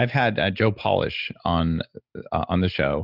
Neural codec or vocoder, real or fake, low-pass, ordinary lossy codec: none; real; 5.4 kHz; Opus, 64 kbps